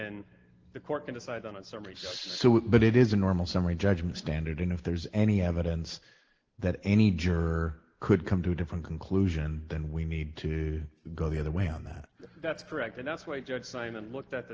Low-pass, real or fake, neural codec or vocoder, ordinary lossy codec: 7.2 kHz; real; none; Opus, 16 kbps